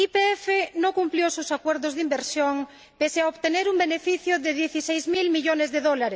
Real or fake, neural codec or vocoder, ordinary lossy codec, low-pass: real; none; none; none